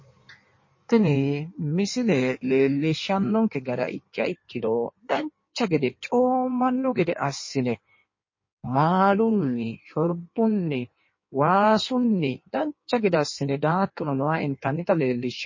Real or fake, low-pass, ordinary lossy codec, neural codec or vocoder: fake; 7.2 kHz; MP3, 32 kbps; codec, 16 kHz in and 24 kHz out, 1.1 kbps, FireRedTTS-2 codec